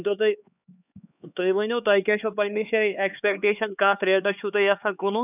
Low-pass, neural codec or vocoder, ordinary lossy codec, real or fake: 3.6 kHz; codec, 16 kHz, 4 kbps, X-Codec, HuBERT features, trained on LibriSpeech; none; fake